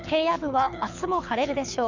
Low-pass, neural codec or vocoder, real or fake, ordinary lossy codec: 7.2 kHz; codec, 24 kHz, 6 kbps, HILCodec; fake; none